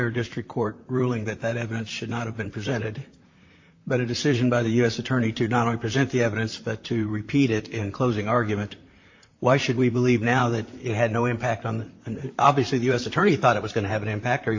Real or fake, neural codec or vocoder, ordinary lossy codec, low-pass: fake; vocoder, 44.1 kHz, 128 mel bands, Pupu-Vocoder; AAC, 48 kbps; 7.2 kHz